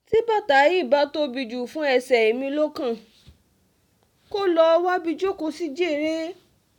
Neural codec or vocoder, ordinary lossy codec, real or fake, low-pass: none; none; real; 19.8 kHz